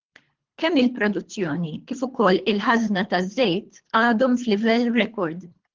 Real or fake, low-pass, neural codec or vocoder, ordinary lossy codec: fake; 7.2 kHz; codec, 24 kHz, 3 kbps, HILCodec; Opus, 16 kbps